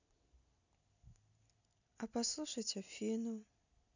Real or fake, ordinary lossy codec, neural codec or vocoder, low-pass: real; none; none; 7.2 kHz